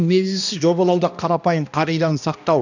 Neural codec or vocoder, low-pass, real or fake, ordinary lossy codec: codec, 16 kHz, 1 kbps, X-Codec, HuBERT features, trained on balanced general audio; 7.2 kHz; fake; none